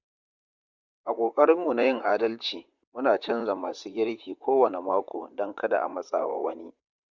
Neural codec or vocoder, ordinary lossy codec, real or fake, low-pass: vocoder, 44.1 kHz, 128 mel bands, Pupu-Vocoder; Opus, 64 kbps; fake; 7.2 kHz